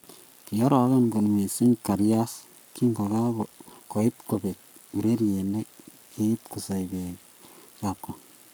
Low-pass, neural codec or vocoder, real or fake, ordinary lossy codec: none; codec, 44.1 kHz, 7.8 kbps, Pupu-Codec; fake; none